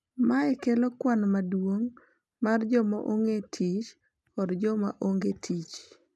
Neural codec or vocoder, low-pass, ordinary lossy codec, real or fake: none; none; none; real